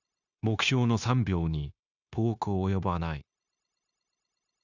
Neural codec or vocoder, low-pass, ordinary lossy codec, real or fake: codec, 16 kHz, 0.9 kbps, LongCat-Audio-Codec; 7.2 kHz; none; fake